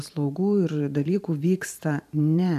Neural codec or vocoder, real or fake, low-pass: vocoder, 44.1 kHz, 128 mel bands every 512 samples, BigVGAN v2; fake; 14.4 kHz